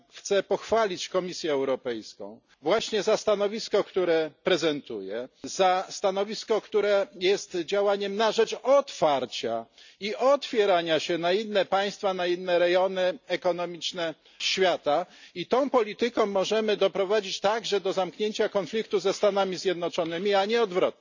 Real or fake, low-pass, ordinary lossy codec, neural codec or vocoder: real; 7.2 kHz; none; none